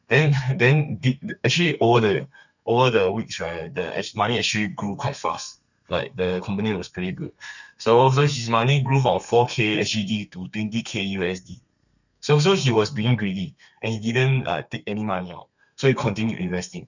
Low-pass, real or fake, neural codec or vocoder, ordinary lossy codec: 7.2 kHz; fake; codec, 32 kHz, 1.9 kbps, SNAC; none